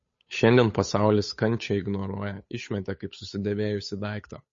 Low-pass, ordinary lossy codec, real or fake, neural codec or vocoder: 7.2 kHz; MP3, 32 kbps; fake; codec, 16 kHz, 8 kbps, FunCodec, trained on Chinese and English, 25 frames a second